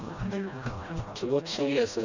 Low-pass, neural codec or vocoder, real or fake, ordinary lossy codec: 7.2 kHz; codec, 16 kHz, 1 kbps, FreqCodec, smaller model; fake; none